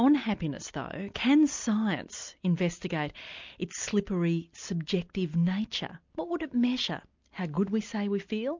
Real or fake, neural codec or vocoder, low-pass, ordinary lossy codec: real; none; 7.2 kHz; MP3, 64 kbps